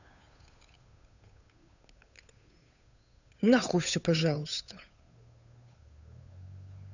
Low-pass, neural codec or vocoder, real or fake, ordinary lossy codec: 7.2 kHz; codec, 16 kHz, 8 kbps, FunCodec, trained on Chinese and English, 25 frames a second; fake; none